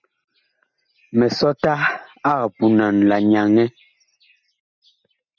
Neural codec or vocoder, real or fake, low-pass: none; real; 7.2 kHz